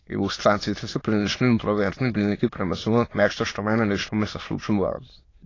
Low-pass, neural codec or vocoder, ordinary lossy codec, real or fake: 7.2 kHz; autoencoder, 22.05 kHz, a latent of 192 numbers a frame, VITS, trained on many speakers; AAC, 32 kbps; fake